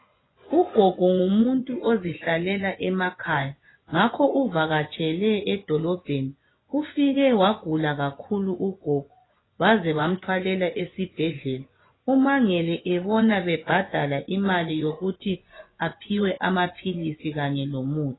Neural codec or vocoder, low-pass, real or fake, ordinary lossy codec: vocoder, 24 kHz, 100 mel bands, Vocos; 7.2 kHz; fake; AAC, 16 kbps